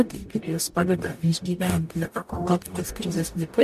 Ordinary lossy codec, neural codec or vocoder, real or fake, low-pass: MP3, 64 kbps; codec, 44.1 kHz, 0.9 kbps, DAC; fake; 14.4 kHz